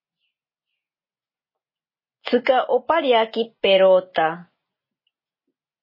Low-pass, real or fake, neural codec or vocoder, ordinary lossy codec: 5.4 kHz; real; none; MP3, 24 kbps